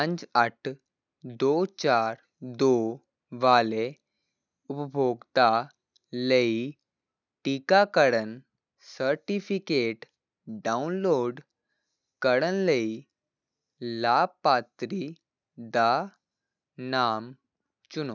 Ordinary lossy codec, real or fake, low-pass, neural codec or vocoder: none; real; 7.2 kHz; none